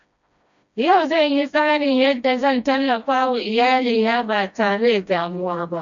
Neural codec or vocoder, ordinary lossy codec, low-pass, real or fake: codec, 16 kHz, 1 kbps, FreqCodec, smaller model; none; 7.2 kHz; fake